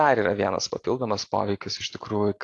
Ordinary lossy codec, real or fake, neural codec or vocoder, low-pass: AAC, 48 kbps; real; none; 10.8 kHz